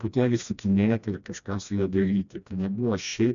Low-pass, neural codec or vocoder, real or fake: 7.2 kHz; codec, 16 kHz, 1 kbps, FreqCodec, smaller model; fake